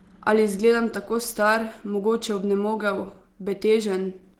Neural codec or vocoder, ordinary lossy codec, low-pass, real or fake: none; Opus, 16 kbps; 19.8 kHz; real